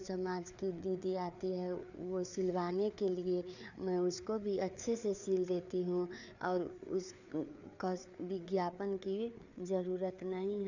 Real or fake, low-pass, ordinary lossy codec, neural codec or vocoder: fake; 7.2 kHz; none; codec, 16 kHz, 4 kbps, FreqCodec, larger model